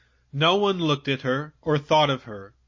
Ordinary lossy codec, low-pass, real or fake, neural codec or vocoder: MP3, 32 kbps; 7.2 kHz; real; none